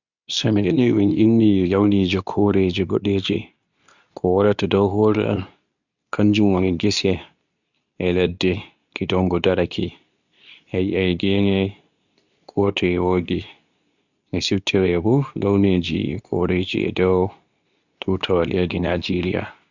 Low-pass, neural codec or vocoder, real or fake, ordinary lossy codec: 7.2 kHz; codec, 24 kHz, 0.9 kbps, WavTokenizer, medium speech release version 2; fake; none